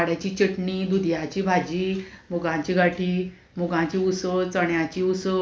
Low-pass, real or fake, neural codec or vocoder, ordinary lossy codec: none; real; none; none